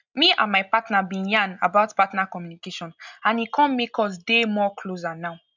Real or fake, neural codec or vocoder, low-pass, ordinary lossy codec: real; none; 7.2 kHz; none